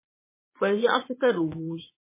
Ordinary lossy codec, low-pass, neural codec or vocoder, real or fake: MP3, 16 kbps; 3.6 kHz; vocoder, 22.05 kHz, 80 mel bands, Vocos; fake